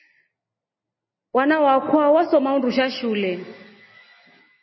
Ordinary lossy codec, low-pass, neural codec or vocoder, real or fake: MP3, 24 kbps; 7.2 kHz; none; real